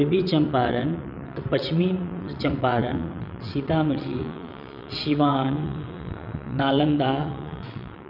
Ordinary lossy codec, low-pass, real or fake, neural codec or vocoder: none; 5.4 kHz; fake; vocoder, 22.05 kHz, 80 mel bands, WaveNeXt